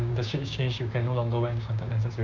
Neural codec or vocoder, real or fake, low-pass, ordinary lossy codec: codec, 16 kHz, 6 kbps, DAC; fake; 7.2 kHz; none